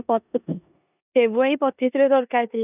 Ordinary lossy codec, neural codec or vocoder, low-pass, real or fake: none; codec, 16 kHz in and 24 kHz out, 0.9 kbps, LongCat-Audio-Codec, four codebook decoder; 3.6 kHz; fake